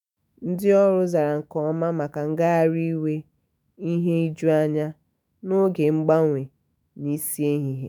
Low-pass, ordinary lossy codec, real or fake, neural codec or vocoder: none; none; fake; autoencoder, 48 kHz, 128 numbers a frame, DAC-VAE, trained on Japanese speech